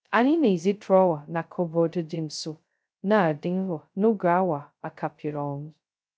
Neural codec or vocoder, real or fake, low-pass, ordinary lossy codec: codec, 16 kHz, 0.2 kbps, FocalCodec; fake; none; none